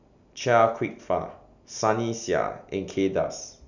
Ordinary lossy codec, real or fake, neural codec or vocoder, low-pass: none; real; none; 7.2 kHz